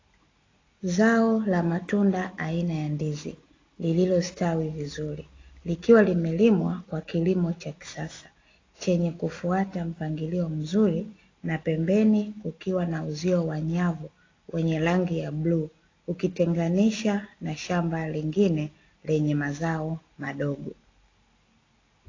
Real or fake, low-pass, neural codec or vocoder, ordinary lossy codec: real; 7.2 kHz; none; AAC, 32 kbps